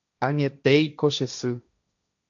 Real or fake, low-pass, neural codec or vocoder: fake; 7.2 kHz; codec, 16 kHz, 1.1 kbps, Voila-Tokenizer